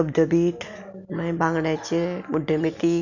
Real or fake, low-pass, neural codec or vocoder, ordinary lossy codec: real; 7.2 kHz; none; none